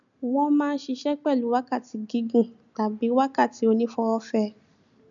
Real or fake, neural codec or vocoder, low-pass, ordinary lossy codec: real; none; 7.2 kHz; none